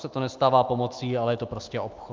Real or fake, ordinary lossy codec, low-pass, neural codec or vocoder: fake; Opus, 32 kbps; 7.2 kHz; autoencoder, 48 kHz, 128 numbers a frame, DAC-VAE, trained on Japanese speech